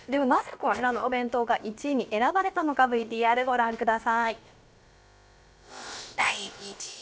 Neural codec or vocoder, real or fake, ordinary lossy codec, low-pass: codec, 16 kHz, about 1 kbps, DyCAST, with the encoder's durations; fake; none; none